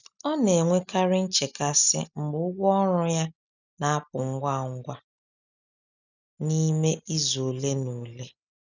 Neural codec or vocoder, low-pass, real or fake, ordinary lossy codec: none; 7.2 kHz; real; none